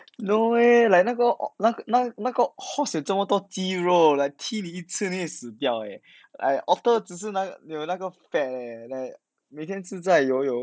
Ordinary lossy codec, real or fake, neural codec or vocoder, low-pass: none; real; none; none